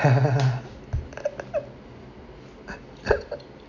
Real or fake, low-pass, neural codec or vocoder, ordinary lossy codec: real; 7.2 kHz; none; none